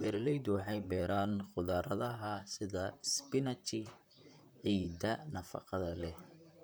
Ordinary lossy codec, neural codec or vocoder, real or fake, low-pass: none; vocoder, 44.1 kHz, 128 mel bands, Pupu-Vocoder; fake; none